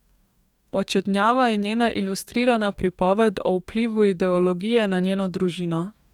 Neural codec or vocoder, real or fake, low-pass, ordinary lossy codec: codec, 44.1 kHz, 2.6 kbps, DAC; fake; 19.8 kHz; none